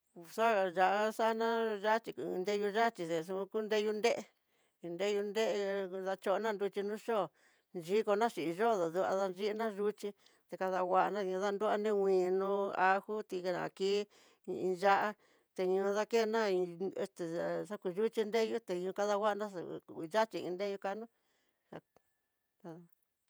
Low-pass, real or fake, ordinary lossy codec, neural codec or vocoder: none; fake; none; vocoder, 48 kHz, 128 mel bands, Vocos